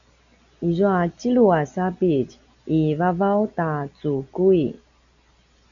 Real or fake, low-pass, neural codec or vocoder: real; 7.2 kHz; none